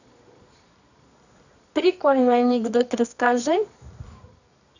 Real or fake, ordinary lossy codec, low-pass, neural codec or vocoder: fake; none; 7.2 kHz; codec, 24 kHz, 0.9 kbps, WavTokenizer, medium music audio release